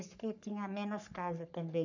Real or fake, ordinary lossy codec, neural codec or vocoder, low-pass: fake; none; codec, 44.1 kHz, 3.4 kbps, Pupu-Codec; 7.2 kHz